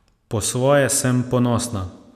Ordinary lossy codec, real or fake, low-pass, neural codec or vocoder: none; real; 14.4 kHz; none